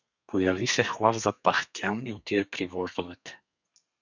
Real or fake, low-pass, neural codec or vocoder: fake; 7.2 kHz; codec, 32 kHz, 1.9 kbps, SNAC